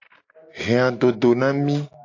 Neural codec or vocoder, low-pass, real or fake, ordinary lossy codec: none; 7.2 kHz; real; AAC, 48 kbps